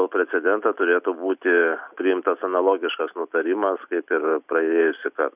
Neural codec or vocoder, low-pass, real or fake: none; 3.6 kHz; real